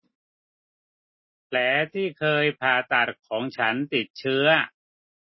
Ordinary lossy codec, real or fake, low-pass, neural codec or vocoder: MP3, 24 kbps; real; 7.2 kHz; none